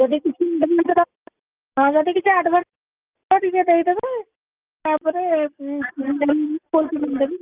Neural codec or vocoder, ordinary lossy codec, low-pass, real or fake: none; Opus, 24 kbps; 3.6 kHz; real